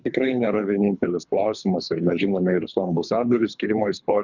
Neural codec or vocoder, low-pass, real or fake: codec, 24 kHz, 3 kbps, HILCodec; 7.2 kHz; fake